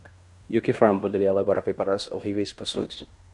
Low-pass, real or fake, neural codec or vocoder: 10.8 kHz; fake; codec, 16 kHz in and 24 kHz out, 0.9 kbps, LongCat-Audio-Codec, fine tuned four codebook decoder